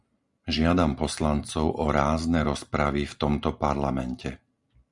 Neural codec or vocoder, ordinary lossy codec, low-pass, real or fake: none; Opus, 64 kbps; 10.8 kHz; real